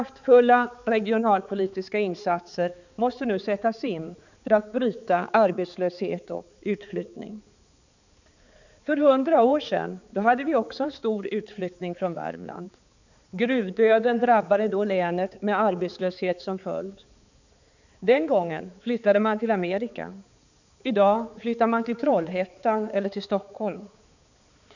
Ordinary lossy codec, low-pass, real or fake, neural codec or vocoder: none; 7.2 kHz; fake; codec, 16 kHz, 4 kbps, X-Codec, HuBERT features, trained on balanced general audio